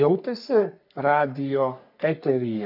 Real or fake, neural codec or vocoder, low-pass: fake; codec, 44.1 kHz, 3.4 kbps, Pupu-Codec; 5.4 kHz